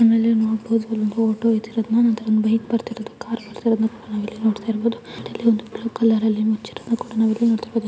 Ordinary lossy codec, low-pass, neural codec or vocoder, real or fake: none; none; none; real